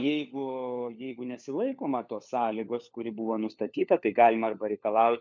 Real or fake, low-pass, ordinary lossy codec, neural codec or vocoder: fake; 7.2 kHz; MP3, 64 kbps; codec, 16 kHz, 4 kbps, FunCodec, trained on LibriTTS, 50 frames a second